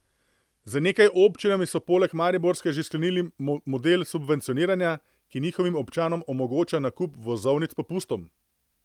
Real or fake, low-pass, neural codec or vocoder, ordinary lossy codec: real; 19.8 kHz; none; Opus, 32 kbps